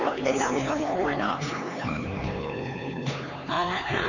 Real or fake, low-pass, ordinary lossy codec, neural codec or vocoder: fake; 7.2 kHz; none; codec, 16 kHz, 4 kbps, X-Codec, HuBERT features, trained on LibriSpeech